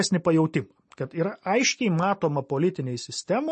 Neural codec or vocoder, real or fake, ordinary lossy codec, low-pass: none; real; MP3, 32 kbps; 10.8 kHz